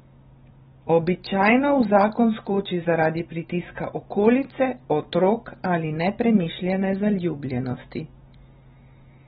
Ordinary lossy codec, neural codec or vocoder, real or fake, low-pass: AAC, 16 kbps; none; real; 14.4 kHz